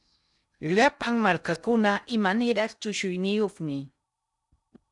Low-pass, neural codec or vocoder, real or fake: 10.8 kHz; codec, 16 kHz in and 24 kHz out, 0.6 kbps, FocalCodec, streaming, 2048 codes; fake